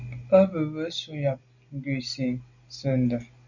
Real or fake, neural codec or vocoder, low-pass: real; none; 7.2 kHz